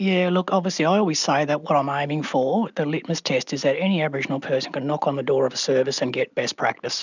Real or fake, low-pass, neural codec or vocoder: real; 7.2 kHz; none